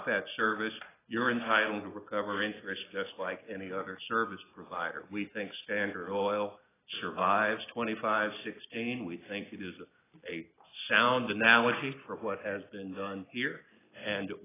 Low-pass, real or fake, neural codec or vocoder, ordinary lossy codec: 3.6 kHz; real; none; AAC, 16 kbps